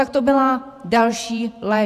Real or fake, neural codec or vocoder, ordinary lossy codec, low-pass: fake; vocoder, 48 kHz, 128 mel bands, Vocos; MP3, 96 kbps; 14.4 kHz